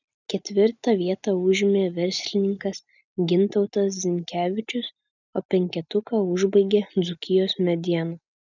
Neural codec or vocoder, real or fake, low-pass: none; real; 7.2 kHz